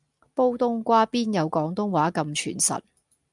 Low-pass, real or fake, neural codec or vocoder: 10.8 kHz; real; none